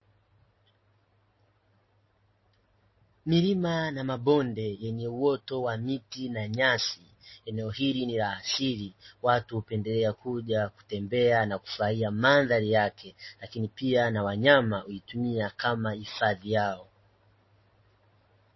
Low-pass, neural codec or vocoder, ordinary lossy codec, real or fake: 7.2 kHz; none; MP3, 24 kbps; real